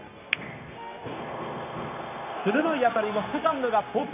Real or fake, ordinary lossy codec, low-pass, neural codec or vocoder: fake; none; 3.6 kHz; codec, 16 kHz, 0.9 kbps, LongCat-Audio-Codec